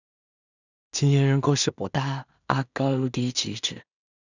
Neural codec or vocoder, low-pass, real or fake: codec, 16 kHz in and 24 kHz out, 0.4 kbps, LongCat-Audio-Codec, two codebook decoder; 7.2 kHz; fake